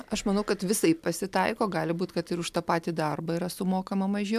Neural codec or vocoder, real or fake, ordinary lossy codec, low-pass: none; real; MP3, 96 kbps; 14.4 kHz